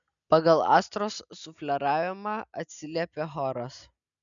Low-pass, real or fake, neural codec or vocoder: 7.2 kHz; real; none